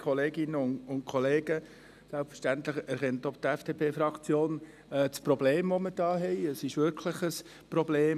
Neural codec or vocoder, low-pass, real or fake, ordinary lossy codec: none; 14.4 kHz; real; none